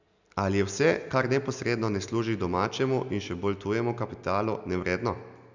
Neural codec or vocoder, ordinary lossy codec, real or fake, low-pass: none; none; real; 7.2 kHz